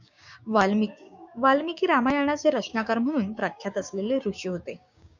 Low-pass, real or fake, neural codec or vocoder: 7.2 kHz; fake; codec, 16 kHz, 6 kbps, DAC